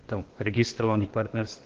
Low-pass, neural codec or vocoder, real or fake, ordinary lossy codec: 7.2 kHz; codec, 16 kHz, 0.8 kbps, ZipCodec; fake; Opus, 16 kbps